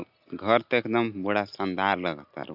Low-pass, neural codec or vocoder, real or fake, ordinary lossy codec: 5.4 kHz; none; real; none